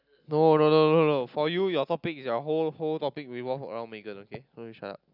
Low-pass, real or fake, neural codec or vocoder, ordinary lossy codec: 5.4 kHz; real; none; none